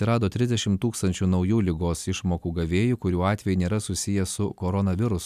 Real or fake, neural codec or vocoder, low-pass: real; none; 14.4 kHz